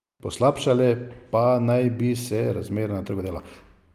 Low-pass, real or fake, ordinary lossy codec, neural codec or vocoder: 14.4 kHz; real; Opus, 32 kbps; none